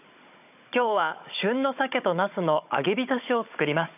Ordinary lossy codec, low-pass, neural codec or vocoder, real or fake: none; 3.6 kHz; codec, 16 kHz, 16 kbps, FunCodec, trained on Chinese and English, 50 frames a second; fake